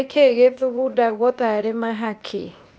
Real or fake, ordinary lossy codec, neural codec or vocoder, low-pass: fake; none; codec, 16 kHz, 0.8 kbps, ZipCodec; none